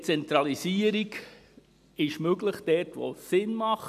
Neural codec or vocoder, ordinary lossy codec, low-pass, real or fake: none; none; 14.4 kHz; real